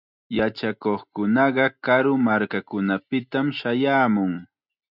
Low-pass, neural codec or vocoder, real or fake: 5.4 kHz; none; real